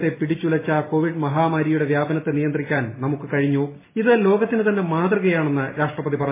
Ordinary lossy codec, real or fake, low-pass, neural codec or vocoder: MP3, 16 kbps; real; 3.6 kHz; none